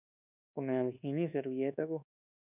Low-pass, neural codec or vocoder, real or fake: 3.6 kHz; codec, 24 kHz, 1.2 kbps, DualCodec; fake